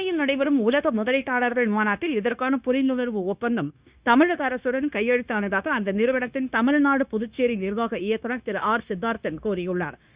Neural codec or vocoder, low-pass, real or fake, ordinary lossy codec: codec, 16 kHz, 0.9 kbps, LongCat-Audio-Codec; 3.6 kHz; fake; Opus, 64 kbps